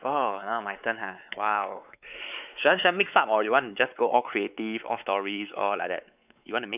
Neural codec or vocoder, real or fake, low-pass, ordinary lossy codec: codec, 16 kHz, 4 kbps, X-Codec, WavLM features, trained on Multilingual LibriSpeech; fake; 3.6 kHz; none